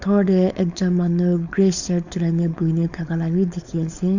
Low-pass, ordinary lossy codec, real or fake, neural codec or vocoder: 7.2 kHz; none; fake; codec, 16 kHz, 4.8 kbps, FACodec